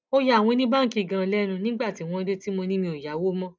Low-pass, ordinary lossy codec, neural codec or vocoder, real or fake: none; none; none; real